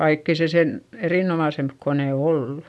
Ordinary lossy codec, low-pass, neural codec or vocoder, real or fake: none; none; none; real